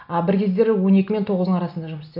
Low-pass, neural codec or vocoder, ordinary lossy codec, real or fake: 5.4 kHz; none; none; real